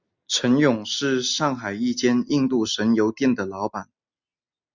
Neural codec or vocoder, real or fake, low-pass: none; real; 7.2 kHz